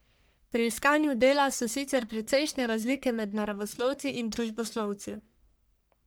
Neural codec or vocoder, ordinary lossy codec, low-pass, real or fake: codec, 44.1 kHz, 1.7 kbps, Pupu-Codec; none; none; fake